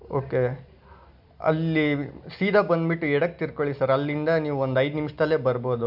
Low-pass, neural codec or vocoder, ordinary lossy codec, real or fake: 5.4 kHz; none; AAC, 48 kbps; real